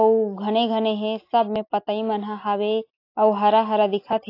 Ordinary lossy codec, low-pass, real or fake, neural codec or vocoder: AAC, 32 kbps; 5.4 kHz; real; none